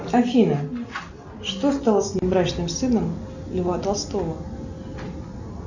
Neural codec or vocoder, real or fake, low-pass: none; real; 7.2 kHz